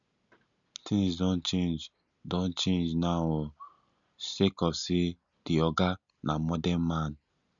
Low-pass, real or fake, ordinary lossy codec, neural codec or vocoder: 7.2 kHz; real; none; none